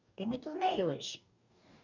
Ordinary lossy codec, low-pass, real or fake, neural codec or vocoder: none; 7.2 kHz; fake; codec, 44.1 kHz, 2.6 kbps, DAC